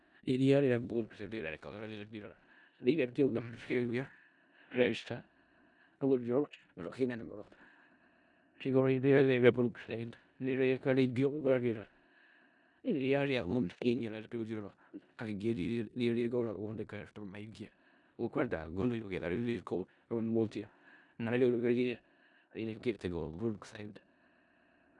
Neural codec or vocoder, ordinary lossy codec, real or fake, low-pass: codec, 16 kHz in and 24 kHz out, 0.4 kbps, LongCat-Audio-Codec, four codebook decoder; none; fake; 10.8 kHz